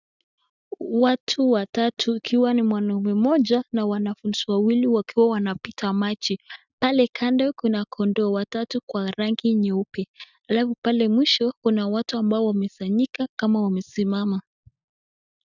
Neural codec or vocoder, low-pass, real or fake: none; 7.2 kHz; real